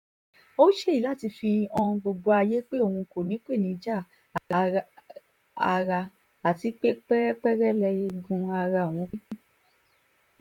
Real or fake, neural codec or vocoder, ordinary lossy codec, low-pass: fake; vocoder, 44.1 kHz, 128 mel bands, Pupu-Vocoder; MP3, 96 kbps; 19.8 kHz